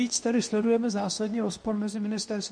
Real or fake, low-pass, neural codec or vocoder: fake; 9.9 kHz; codec, 24 kHz, 0.9 kbps, WavTokenizer, medium speech release version 2